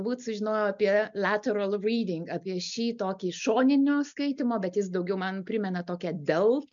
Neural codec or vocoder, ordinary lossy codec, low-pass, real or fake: none; MP3, 64 kbps; 7.2 kHz; real